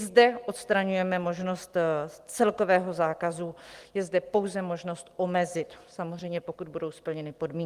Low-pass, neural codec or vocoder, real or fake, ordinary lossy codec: 14.4 kHz; none; real; Opus, 24 kbps